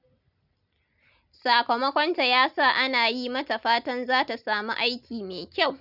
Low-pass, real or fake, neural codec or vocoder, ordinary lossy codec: 5.4 kHz; real; none; none